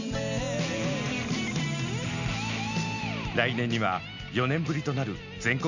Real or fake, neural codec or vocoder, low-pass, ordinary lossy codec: real; none; 7.2 kHz; none